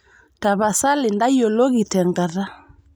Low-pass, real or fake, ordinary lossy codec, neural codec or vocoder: none; real; none; none